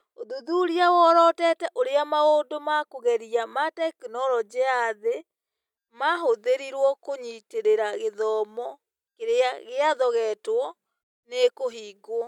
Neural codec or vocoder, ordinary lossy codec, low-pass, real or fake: none; none; 19.8 kHz; real